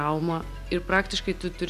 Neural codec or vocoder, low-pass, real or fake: none; 14.4 kHz; real